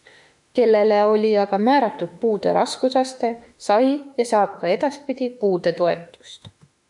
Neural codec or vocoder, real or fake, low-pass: autoencoder, 48 kHz, 32 numbers a frame, DAC-VAE, trained on Japanese speech; fake; 10.8 kHz